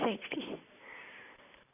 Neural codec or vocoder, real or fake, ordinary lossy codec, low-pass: none; real; none; 3.6 kHz